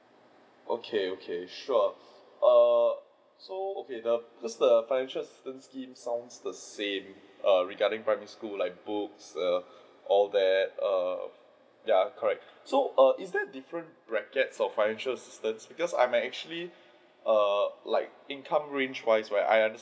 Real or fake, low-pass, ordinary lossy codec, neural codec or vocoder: real; none; none; none